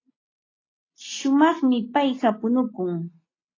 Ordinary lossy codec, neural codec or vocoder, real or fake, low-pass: AAC, 32 kbps; none; real; 7.2 kHz